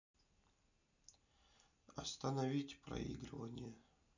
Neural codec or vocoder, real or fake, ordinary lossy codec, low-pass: none; real; none; 7.2 kHz